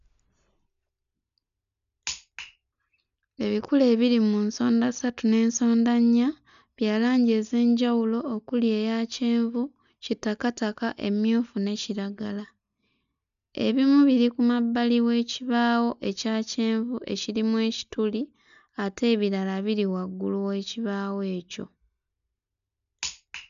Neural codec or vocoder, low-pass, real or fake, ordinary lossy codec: none; 7.2 kHz; real; MP3, 96 kbps